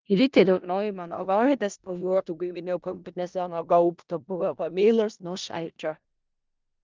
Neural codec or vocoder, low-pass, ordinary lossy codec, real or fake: codec, 16 kHz in and 24 kHz out, 0.4 kbps, LongCat-Audio-Codec, four codebook decoder; 7.2 kHz; Opus, 32 kbps; fake